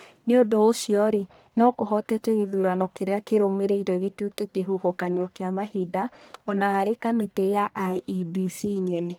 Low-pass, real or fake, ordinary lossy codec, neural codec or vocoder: none; fake; none; codec, 44.1 kHz, 1.7 kbps, Pupu-Codec